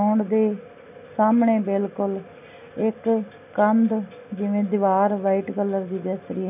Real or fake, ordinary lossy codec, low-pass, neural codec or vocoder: real; none; 3.6 kHz; none